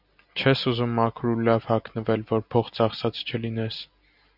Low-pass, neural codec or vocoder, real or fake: 5.4 kHz; none; real